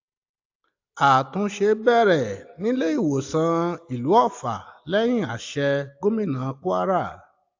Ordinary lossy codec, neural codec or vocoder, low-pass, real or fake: AAC, 48 kbps; none; 7.2 kHz; real